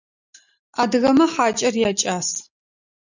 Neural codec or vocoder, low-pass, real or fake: none; 7.2 kHz; real